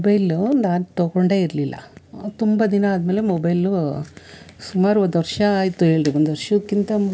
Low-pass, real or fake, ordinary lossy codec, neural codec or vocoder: none; real; none; none